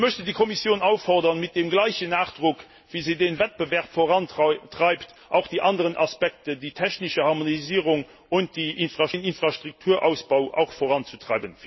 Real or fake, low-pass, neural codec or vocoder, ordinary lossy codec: real; 7.2 kHz; none; MP3, 24 kbps